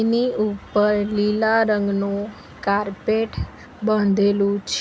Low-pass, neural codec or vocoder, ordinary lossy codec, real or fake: none; none; none; real